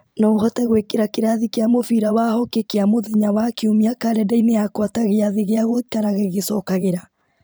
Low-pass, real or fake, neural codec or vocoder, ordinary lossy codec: none; fake; vocoder, 44.1 kHz, 128 mel bands every 512 samples, BigVGAN v2; none